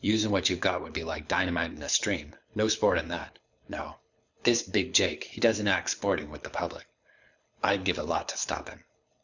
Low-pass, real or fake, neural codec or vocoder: 7.2 kHz; fake; codec, 24 kHz, 6 kbps, HILCodec